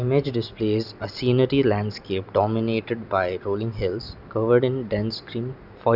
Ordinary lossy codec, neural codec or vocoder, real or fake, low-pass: Opus, 64 kbps; none; real; 5.4 kHz